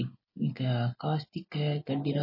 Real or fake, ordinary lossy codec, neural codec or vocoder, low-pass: real; MP3, 24 kbps; none; 5.4 kHz